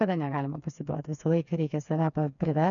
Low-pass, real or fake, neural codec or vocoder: 7.2 kHz; fake; codec, 16 kHz, 4 kbps, FreqCodec, smaller model